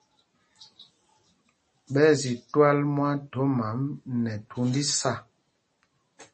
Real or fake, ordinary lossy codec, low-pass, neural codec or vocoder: real; MP3, 32 kbps; 10.8 kHz; none